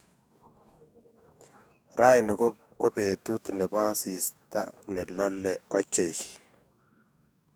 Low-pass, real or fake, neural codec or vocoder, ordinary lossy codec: none; fake; codec, 44.1 kHz, 2.6 kbps, DAC; none